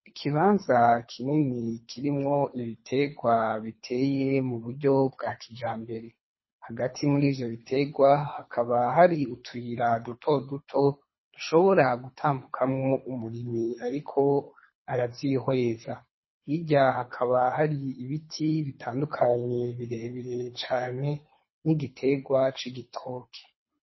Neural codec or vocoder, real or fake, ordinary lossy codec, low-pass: codec, 24 kHz, 3 kbps, HILCodec; fake; MP3, 24 kbps; 7.2 kHz